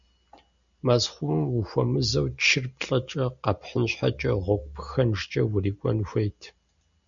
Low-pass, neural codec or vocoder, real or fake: 7.2 kHz; none; real